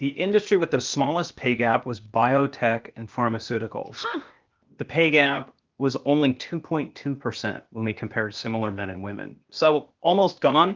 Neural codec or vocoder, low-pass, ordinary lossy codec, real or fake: codec, 16 kHz, 0.8 kbps, ZipCodec; 7.2 kHz; Opus, 32 kbps; fake